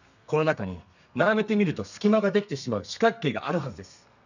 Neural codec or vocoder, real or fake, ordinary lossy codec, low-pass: codec, 32 kHz, 1.9 kbps, SNAC; fake; none; 7.2 kHz